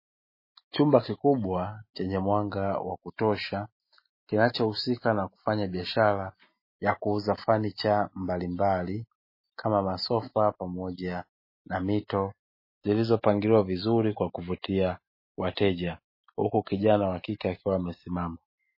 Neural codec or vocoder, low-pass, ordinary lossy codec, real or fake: none; 5.4 kHz; MP3, 24 kbps; real